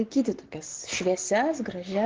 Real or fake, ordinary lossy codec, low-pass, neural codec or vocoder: real; Opus, 16 kbps; 7.2 kHz; none